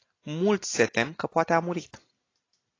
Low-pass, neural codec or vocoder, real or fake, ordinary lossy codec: 7.2 kHz; none; real; AAC, 32 kbps